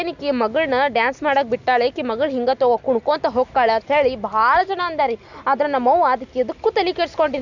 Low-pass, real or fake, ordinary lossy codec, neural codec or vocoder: 7.2 kHz; real; none; none